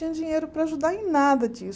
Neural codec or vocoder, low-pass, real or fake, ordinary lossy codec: none; none; real; none